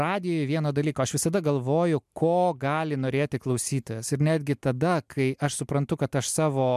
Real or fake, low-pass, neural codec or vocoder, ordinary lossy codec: real; 14.4 kHz; none; MP3, 96 kbps